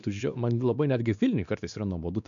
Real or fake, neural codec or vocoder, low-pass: fake; codec, 16 kHz, 2 kbps, X-Codec, WavLM features, trained on Multilingual LibriSpeech; 7.2 kHz